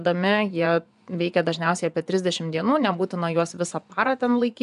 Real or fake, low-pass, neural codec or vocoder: fake; 10.8 kHz; vocoder, 24 kHz, 100 mel bands, Vocos